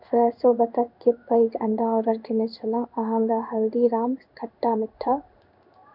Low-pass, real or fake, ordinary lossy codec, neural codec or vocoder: 5.4 kHz; fake; none; codec, 16 kHz in and 24 kHz out, 1 kbps, XY-Tokenizer